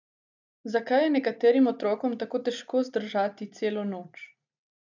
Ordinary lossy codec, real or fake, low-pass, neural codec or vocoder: none; real; 7.2 kHz; none